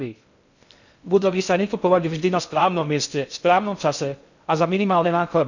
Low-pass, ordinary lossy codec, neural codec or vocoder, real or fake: 7.2 kHz; none; codec, 16 kHz in and 24 kHz out, 0.6 kbps, FocalCodec, streaming, 2048 codes; fake